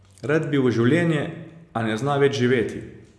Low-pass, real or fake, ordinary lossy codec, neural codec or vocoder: none; real; none; none